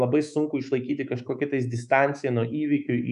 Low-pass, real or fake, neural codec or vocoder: 10.8 kHz; fake; codec, 24 kHz, 3.1 kbps, DualCodec